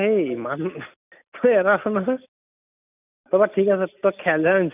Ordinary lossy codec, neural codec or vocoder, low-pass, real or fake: none; none; 3.6 kHz; real